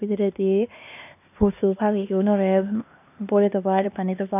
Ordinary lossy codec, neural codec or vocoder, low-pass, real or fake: none; codec, 16 kHz, 2 kbps, X-Codec, HuBERT features, trained on LibriSpeech; 3.6 kHz; fake